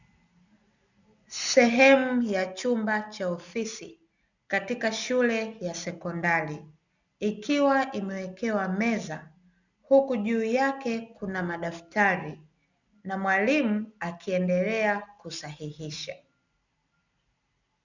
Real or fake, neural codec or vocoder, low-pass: real; none; 7.2 kHz